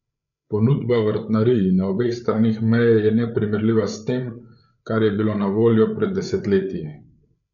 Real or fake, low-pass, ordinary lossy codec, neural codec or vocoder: fake; 7.2 kHz; none; codec, 16 kHz, 8 kbps, FreqCodec, larger model